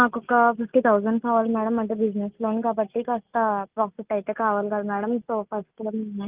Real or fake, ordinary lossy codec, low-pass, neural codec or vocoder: real; Opus, 32 kbps; 3.6 kHz; none